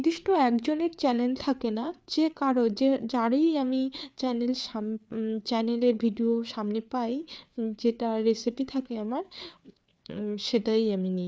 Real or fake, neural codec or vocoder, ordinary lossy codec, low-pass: fake; codec, 16 kHz, 4 kbps, FreqCodec, larger model; none; none